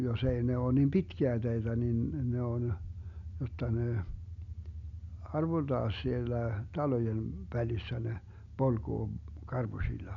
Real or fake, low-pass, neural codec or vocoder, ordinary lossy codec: real; 7.2 kHz; none; none